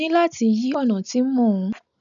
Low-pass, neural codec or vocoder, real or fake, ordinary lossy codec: 7.2 kHz; none; real; none